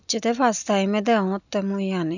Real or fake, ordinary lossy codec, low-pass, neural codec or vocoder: real; none; 7.2 kHz; none